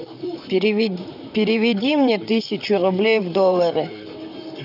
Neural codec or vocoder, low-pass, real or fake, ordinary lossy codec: vocoder, 44.1 kHz, 128 mel bands, Pupu-Vocoder; 5.4 kHz; fake; none